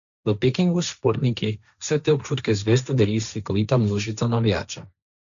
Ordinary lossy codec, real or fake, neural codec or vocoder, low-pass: none; fake; codec, 16 kHz, 1.1 kbps, Voila-Tokenizer; 7.2 kHz